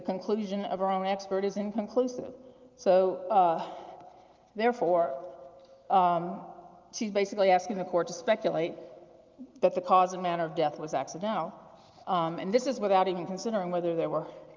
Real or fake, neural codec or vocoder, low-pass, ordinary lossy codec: real; none; 7.2 kHz; Opus, 32 kbps